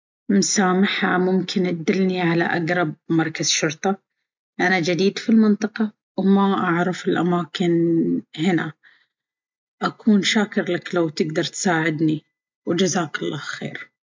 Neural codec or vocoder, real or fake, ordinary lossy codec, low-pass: none; real; MP3, 64 kbps; 7.2 kHz